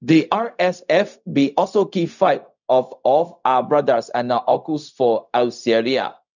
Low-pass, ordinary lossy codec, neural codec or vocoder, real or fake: 7.2 kHz; none; codec, 16 kHz, 0.4 kbps, LongCat-Audio-Codec; fake